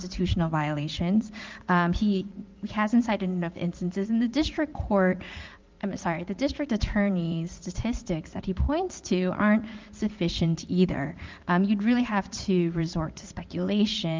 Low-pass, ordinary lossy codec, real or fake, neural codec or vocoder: 7.2 kHz; Opus, 32 kbps; fake; vocoder, 44.1 kHz, 80 mel bands, Vocos